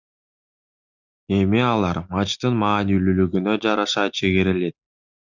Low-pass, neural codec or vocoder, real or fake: 7.2 kHz; none; real